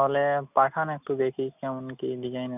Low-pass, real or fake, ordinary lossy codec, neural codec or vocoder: 3.6 kHz; real; none; none